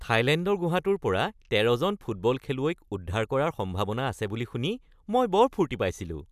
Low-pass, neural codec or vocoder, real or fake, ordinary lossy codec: 14.4 kHz; none; real; none